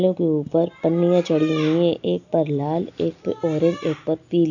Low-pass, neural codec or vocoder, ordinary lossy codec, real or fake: 7.2 kHz; none; none; real